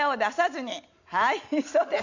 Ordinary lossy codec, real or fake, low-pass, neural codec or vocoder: none; real; 7.2 kHz; none